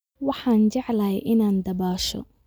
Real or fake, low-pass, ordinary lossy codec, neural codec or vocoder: real; none; none; none